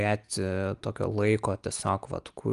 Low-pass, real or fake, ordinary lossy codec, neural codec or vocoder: 10.8 kHz; real; Opus, 32 kbps; none